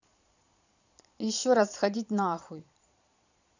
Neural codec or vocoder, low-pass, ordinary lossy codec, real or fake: vocoder, 22.05 kHz, 80 mel bands, Vocos; 7.2 kHz; none; fake